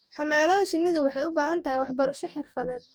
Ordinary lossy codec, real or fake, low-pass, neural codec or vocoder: none; fake; none; codec, 44.1 kHz, 2.6 kbps, DAC